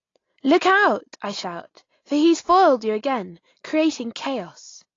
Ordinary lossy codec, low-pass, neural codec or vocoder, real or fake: AAC, 32 kbps; 7.2 kHz; none; real